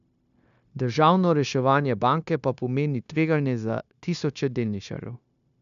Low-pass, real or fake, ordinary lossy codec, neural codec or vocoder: 7.2 kHz; fake; none; codec, 16 kHz, 0.9 kbps, LongCat-Audio-Codec